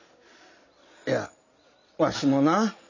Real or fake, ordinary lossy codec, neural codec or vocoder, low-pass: real; none; none; 7.2 kHz